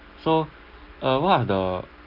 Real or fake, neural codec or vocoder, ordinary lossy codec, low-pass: real; none; Opus, 24 kbps; 5.4 kHz